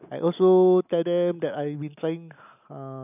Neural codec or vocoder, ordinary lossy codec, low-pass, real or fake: none; none; 3.6 kHz; real